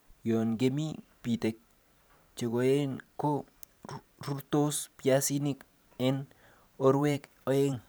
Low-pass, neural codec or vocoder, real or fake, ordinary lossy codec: none; vocoder, 44.1 kHz, 128 mel bands every 512 samples, BigVGAN v2; fake; none